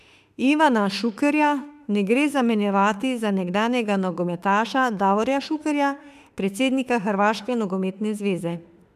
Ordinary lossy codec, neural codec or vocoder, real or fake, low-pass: none; autoencoder, 48 kHz, 32 numbers a frame, DAC-VAE, trained on Japanese speech; fake; 14.4 kHz